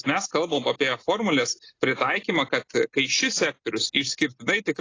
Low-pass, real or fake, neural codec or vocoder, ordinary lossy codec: 7.2 kHz; real; none; AAC, 32 kbps